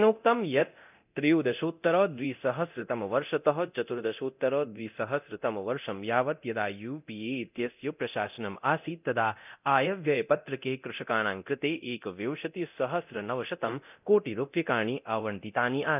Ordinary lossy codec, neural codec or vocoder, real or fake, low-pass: none; codec, 24 kHz, 0.9 kbps, DualCodec; fake; 3.6 kHz